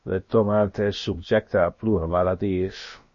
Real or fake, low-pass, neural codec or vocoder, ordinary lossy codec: fake; 7.2 kHz; codec, 16 kHz, about 1 kbps, DyCAST, with the encoder's durations; MP3, 32 kbps